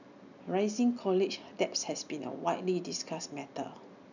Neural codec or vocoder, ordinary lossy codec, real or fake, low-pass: none; none; real; 7.2 kHz